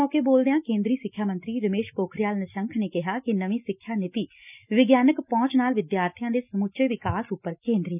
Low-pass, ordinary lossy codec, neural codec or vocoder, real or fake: 3.6 kHz; none; none; real